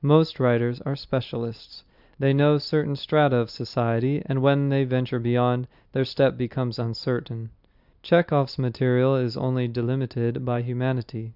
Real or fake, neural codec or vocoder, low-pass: real; none; 5.4 kHz